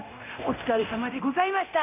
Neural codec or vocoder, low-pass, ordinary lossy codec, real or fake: codec, 24 kHz, 0.9 kbps, DualCodec; 3.6 kHz; none; fake